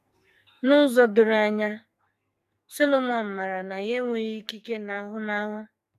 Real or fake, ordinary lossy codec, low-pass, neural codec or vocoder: fake; none; 14.4 kHz; codec, 44.1 kHz, 2.6 kbps, SNAC